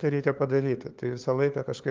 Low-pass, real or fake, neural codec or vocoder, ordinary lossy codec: 7.2 kHz; fake; codec, 16 kHz, 2 kbps, FunCodec, trained on LibriTTS, 25 frames a second; Opus, 24 kbps